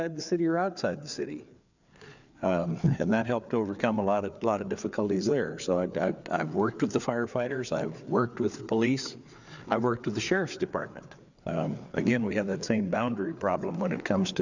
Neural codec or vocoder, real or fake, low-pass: codec, 16 kHz, 4 kbps, FreqCodec, larger model; fake; 7.2 kHz